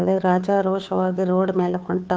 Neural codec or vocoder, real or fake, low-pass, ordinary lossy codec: codec, 16 kHz, 2 kbps, FunCodec, trained on Chinese and English, 25 frames a second; fake; none; none